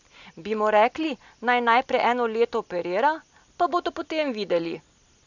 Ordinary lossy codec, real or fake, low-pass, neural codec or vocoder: none; real; 7.2 kHz; none